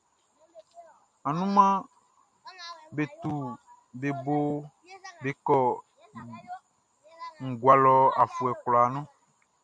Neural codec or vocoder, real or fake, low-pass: none; real; 9.9 kHz